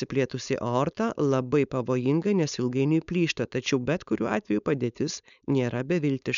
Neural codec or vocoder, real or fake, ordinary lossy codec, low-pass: codec, 16 kHz, 4.8 kbps, FACodec; fake; MP3, 96 kbps; 7.2 kHz